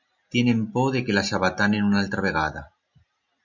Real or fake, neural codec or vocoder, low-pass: real; none; 7.2 kHz